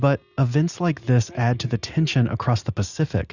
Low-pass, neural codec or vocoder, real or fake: 7.2 kHz; none; real